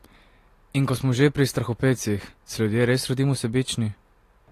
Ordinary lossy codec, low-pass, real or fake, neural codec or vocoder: AAC, 48 kbps; 14.4 kHz; real; none